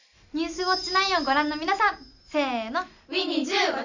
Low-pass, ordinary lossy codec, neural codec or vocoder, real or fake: 7.2 kHz; none; none; real